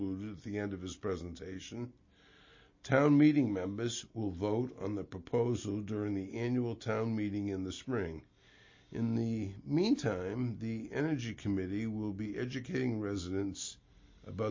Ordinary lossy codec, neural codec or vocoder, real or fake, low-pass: MP3, 32 kbps; none; real; 7.2 kHz